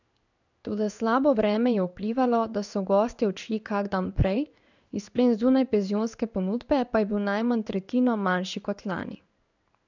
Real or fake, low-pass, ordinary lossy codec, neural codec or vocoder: fake; 7.2 kHz; none; codec, 16 kHz in and 24 kHz out, 1 kbps, XY-Tokenizer